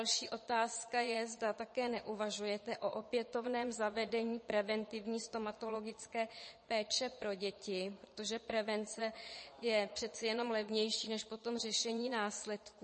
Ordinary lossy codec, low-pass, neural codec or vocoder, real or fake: MP3, 32 kbps; 9.9 kHz; vocoder, 22.05 kHz, 80 mel bands, Vocos; fake